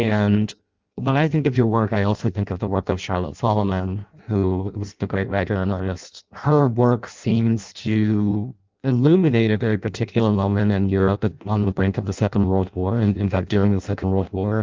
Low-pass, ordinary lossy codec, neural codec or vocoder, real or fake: 7.2 kHz; Opus, 24 kbps; codec, 16 kHz in and 24 kHz out, 0.6 kbps, FireRedTTS-2 codec; fake